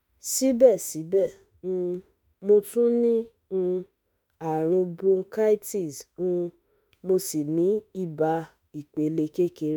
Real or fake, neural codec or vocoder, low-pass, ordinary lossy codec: fake; autoencoder, 48 kHz, 32 numbers a frame, DAC-VAE, trained on Japanese speech; none; none